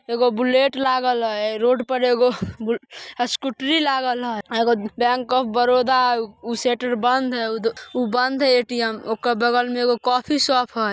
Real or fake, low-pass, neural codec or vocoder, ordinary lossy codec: real; none; none; none